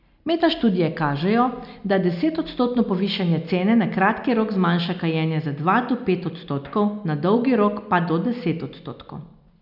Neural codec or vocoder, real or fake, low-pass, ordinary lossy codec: none; real; 5.4 kHz; none